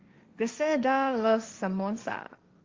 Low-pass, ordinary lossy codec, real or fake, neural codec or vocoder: 7.2 kHz; Opus, 32 kbps; fake; codec, 16 kHz, 1.1 kbps, Voila-Tokenizer